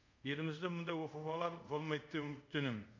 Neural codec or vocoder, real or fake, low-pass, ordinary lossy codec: codec, 24 kHz, 0.5 kbps, DualCodec; fake; 7.2 kHz; AAC, 48 kbps